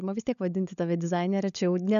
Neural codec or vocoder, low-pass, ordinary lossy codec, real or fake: codec, 16 kHz, 16 kbps, FunCodec, trained on LibriTTS, 50 frames a second; 7.2 kHz; AAC, 96 kbps; fake